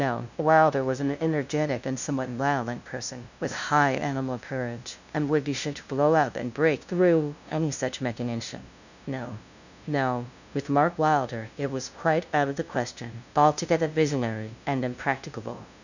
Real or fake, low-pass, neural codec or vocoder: fake; 7.2 kHz; codec, 16 kHz, 0.5 kbps, FunCodec, trained on LibriTTS, 25 frames a second